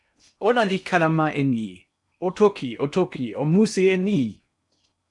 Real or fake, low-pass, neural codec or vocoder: fake; 10.8 kHz; codec, 16 kHz in and 24 kHz out, 0.8 kbps, FocalCodec, streaming, 65536 codes